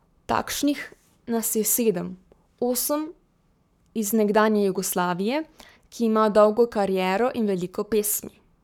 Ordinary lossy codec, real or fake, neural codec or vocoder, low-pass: none; fake; codec, 44.1 kHz, 7.8 kbps, Pupu-Codec; 19.8 kHz